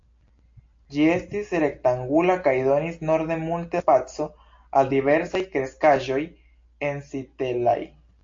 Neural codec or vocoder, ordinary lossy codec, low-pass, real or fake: none; AAC, 48 kbps; 7.2 kHz; real